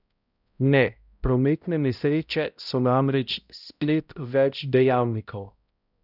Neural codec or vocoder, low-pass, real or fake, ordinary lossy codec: codec, 16 kHz, 0.5 kbps, X-Codec, HuBERT features, trained on balanced general audio; 5.4 kHz; fake; none